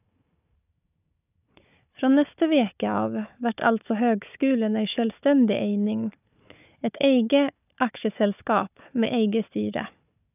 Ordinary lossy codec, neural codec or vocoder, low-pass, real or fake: none; codec, 16 kHz, 4 kbps, FunCodec, trained on Chinese and English, 50 frames a second; 3.6 kHz; fake